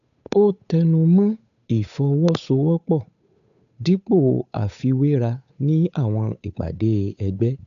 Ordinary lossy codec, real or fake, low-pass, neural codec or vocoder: none; fake; 7.2 kHz; codec, 16 kHz, 8 kbps, FunCodec, trained on Chinese and English, 25 frames a second